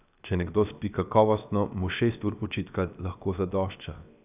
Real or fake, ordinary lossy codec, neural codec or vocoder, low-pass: fake; none; codec, 16 kHz, 0.7 kbps, FocalCodec; 3.6 kHz